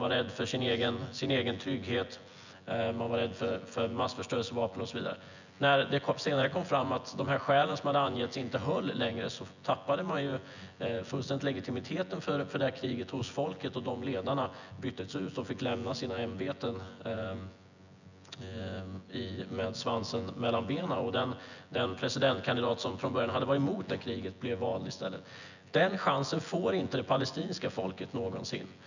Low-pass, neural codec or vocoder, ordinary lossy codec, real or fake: 7.2 kHz; vocoder, 24 kHz, 100 mel bands, Vocos; none; fake